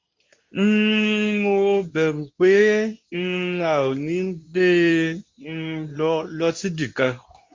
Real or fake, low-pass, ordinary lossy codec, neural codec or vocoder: fake; 7.2 kHz; MP3, 48 kbps; codec, 24 kHz, 0.9 kbps, WavTokenizer, medium speech release version 2